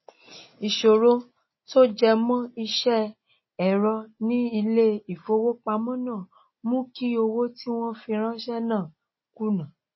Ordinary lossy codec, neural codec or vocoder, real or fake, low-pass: MP3, 24 kbps; none; real; 7.2 kHz